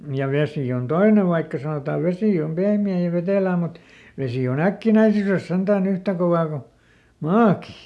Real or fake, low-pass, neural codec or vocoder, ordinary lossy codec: real; none; none; none